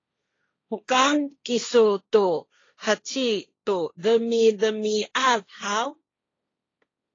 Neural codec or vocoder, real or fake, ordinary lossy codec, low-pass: codec, 16 kHz, 1.1 kbps, Voila-Tokenizer; fake; AAC, 32 kbps; 7.2 kHz